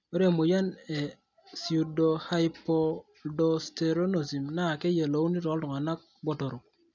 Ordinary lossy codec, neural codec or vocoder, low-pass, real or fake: none; none; 7.2 kHz; real